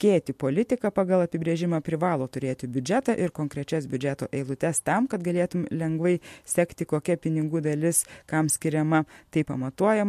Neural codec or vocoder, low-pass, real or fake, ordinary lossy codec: none; 14.4 kHz; real; MP3, 64 kbps